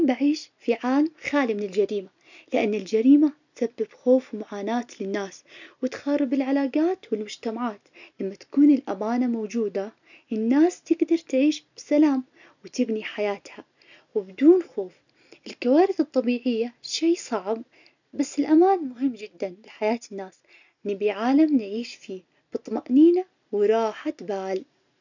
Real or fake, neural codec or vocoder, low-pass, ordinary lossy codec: real; none; 7.2 kHz; none